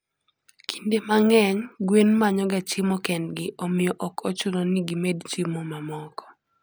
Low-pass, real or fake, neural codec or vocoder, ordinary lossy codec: none; real; none; none